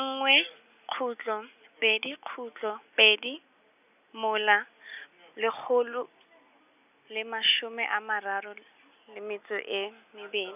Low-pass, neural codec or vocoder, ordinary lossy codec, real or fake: 3.6 kHz; none; none; real